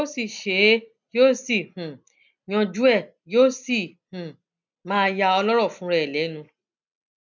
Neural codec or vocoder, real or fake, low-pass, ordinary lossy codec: none; real; 7.2 kHz; none